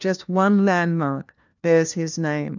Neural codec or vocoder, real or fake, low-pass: codec, 16 kHz, 1 kbps, FunCodec, trained on LibriTTS, 50 frames a second; fake; 7.2 kHz